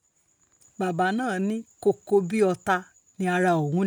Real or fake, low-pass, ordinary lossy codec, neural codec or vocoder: real; none; none; none